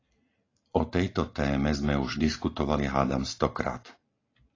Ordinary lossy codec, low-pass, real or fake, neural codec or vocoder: AAC, 32 kbps; 7.2 kHz; real; none